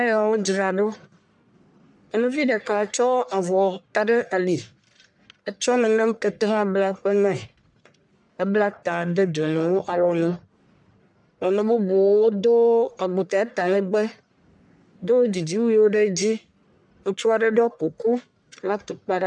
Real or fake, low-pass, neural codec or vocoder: fake; 10.8 kHz; codec, 44.1 kHz, 1.7 kbps, Pupu-Codec